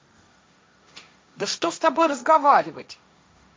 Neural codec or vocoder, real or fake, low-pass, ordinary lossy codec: codec, 16 kHz, 1.1 kbps, Voila-Tokenizer; fake; none; none